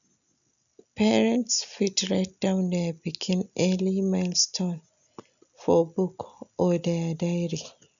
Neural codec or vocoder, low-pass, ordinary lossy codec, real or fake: none; 7.2 kHz; none; real